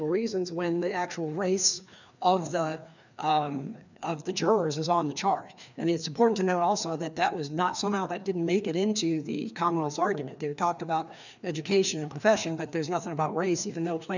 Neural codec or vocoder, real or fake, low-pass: codec, 16 kHz, 2 kbps, FreqCodec, larger model; fake; 7.2 kHz